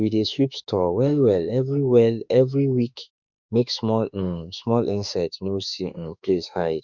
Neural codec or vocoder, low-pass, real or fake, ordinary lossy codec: autoencoder, 48 kHz, 32 numbers a frame, DAC-VAE, trained on Japanese speech; 7.2 kHz; fake; none